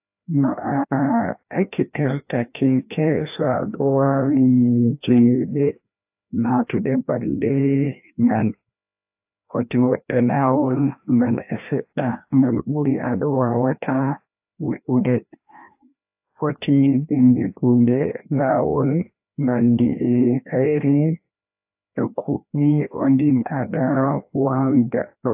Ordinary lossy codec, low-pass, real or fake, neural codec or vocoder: AAC, 32 kbps; 3.6 kHz; fake; codec, 16 kHz, 1 kbps, FreqCodec, larger model